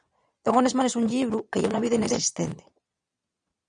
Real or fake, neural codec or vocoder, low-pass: fake; vocoder, 22.05 kHz, 80 mel bands, Vocos; 9.9 kHz